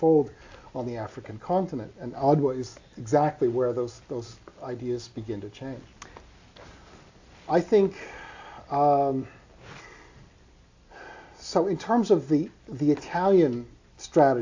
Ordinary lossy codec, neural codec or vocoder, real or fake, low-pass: AAC, 48 kbps; none; real; 7.2 kHz